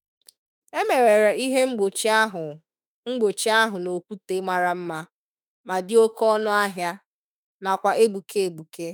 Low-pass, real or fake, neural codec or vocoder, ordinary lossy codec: none; fake; autoencoder, 48 kHz, 32 numbers a frame, DAC-VAE, trained on Japanese speech; none